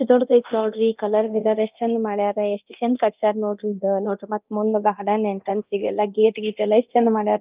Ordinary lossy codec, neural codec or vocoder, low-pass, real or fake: Opus, 32 kbps; codec, 24 kHz, 0.9 kbps, DualCodec; 3.6 kHz; fake